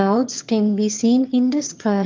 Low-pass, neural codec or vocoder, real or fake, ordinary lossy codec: 7.2 kHz; autoencoder, 22.05 kHz, a latent of 192 numbers a frame, VITS, trained on one speaker; fake; Opus, 32 kbps